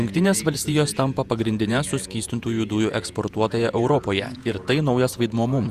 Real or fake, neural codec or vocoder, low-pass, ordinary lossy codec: fake; vocoder, 48 kHz, 128 mel bands, Vocos; 14.4 kHz; Opus, 64 kbps